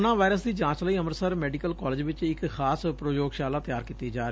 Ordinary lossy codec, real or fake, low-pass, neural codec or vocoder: none; real; none; none